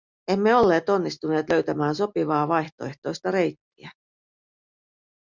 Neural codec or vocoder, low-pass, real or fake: none; 7.2 kHz; real